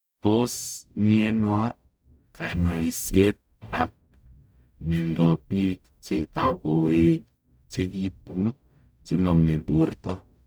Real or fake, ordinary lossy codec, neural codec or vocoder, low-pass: fake; none; codec, 44.1 kHz, 0.9 kbps, DAC; none